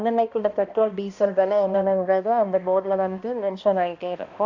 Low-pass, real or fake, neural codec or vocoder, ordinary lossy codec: 7.2 kHz; fake; codec, 16 kHz, 1 kbps, X-Codec, HuBERT features, trained on balanced general audio; AAC, 48 kbps